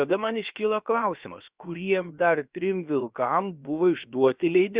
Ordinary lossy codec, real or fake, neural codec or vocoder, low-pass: Opus, 64 kbps; fake; codec, 16 kHz, about 1 kbps, DyCAST, with the encoder's durations; 3.6 kHz